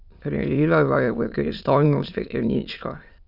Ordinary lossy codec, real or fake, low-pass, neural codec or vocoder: none; fake; 5.4 kHz; autoencoder, 22.05 kHz, a latent of 192 numbers a frame, VITS, trained on many speakers